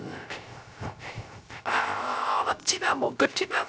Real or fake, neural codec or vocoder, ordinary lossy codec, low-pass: fake; codec, 16 kHz, 0.3 kbps, FocalCodec; none; none